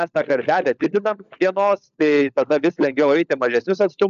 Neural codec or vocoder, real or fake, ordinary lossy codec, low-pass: codec, 16 kHz, 16 kbps, FunCodec, trained on LibriTTS, 50 frames a second; fake; AAC, 96 kbps; 7.2 kHz